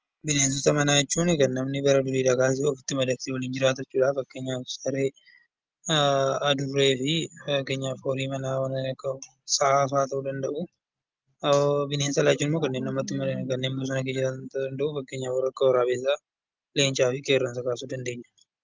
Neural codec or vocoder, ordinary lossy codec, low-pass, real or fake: none; Opus, 24 kbps; 7.2 kHz; real